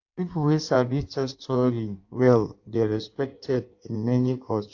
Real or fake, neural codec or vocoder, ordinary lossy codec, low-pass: fake; codec, 16 kHz in and 24 kHz out, 1.1 kbps, FireRedTTS-2 codec; AAC, 48 kbps; 7.2 kHz